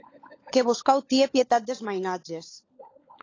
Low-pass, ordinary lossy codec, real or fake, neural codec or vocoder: 7.2 kHz; AAC, 32 kbps; fake; codec, 16 kHz, 16 kbps, FunCodec, trained on LibriTTS, 50 frames a second